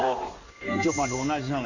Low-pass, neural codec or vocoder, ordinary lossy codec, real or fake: 7.2 kHz; codec, 16 kHz in and 24 kHz out, 1 kbps, XY-Tokenizer; none; fake